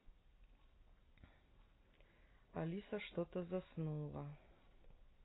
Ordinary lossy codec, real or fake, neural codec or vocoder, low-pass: AAC, 16 kbps; real; none; 7.2 kHz